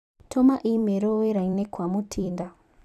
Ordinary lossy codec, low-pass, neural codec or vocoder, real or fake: none; 14.4 kHz; vocoder, 44.1 kHz, 128 mel bands every 256 samples, BigVGAN v2; fake